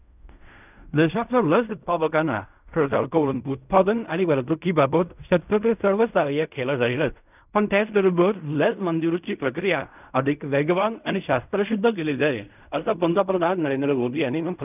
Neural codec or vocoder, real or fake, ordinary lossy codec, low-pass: codec, 16 kHz in and 24 kHz out, 0.4 kbps, LongCat-Audio-Codec, fine tuned four codebook decoder; fake; none; 3.6 kHz